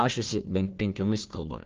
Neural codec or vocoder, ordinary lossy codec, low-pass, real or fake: codec, 16 kHz, 1 kbps, FunCodec, trained on Chinese and English, 50 frames a second; Opus, 16 kbps; 7.2 kHz; fake